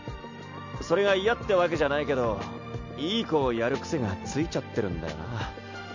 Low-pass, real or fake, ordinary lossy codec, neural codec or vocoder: 7.2 kHz; real; none; none